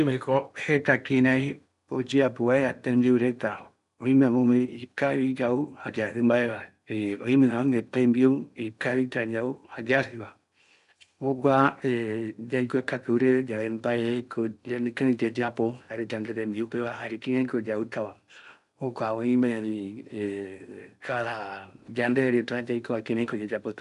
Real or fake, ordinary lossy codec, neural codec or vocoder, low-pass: fake; MP3, 96 kbps; codec, 16 kHz in and 24 kHz out, 0.8 kbps, FocalCodec, streaming, 65536 codes; 10.8 kHz